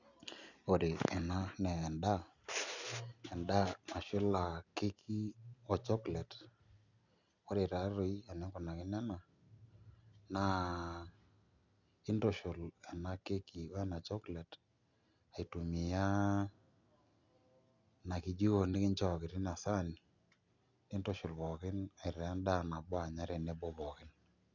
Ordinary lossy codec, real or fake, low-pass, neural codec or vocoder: none; real; 7.2 kHz; none